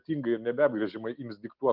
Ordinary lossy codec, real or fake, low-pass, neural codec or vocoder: Opus, 24 kbps; real; 5.4 kHz; none